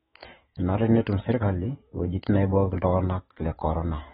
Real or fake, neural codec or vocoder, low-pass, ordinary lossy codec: fake; autoencoder, 48 kHz, 128 numbers a frame, DAC-VAE, trained on Japanese speech; 19.8 kHz; AAC, 16 kbps